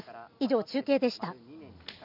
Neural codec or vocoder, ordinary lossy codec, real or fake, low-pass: none; none; real; 5.4 kHz